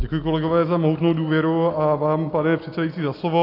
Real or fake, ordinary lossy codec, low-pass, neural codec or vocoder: real; AAC, 32 kbps; 5.4 kHz; none